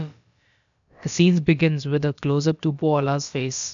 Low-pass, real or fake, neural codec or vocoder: 7.2 kHz; fake; codec, 16 kHz, about 1 kbps, DyCAST, with the encoder's durations